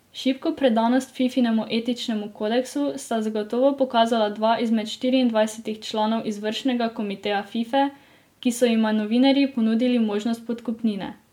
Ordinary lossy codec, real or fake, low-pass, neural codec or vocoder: MP3, 96 kbps; real; 19.8 kHz; none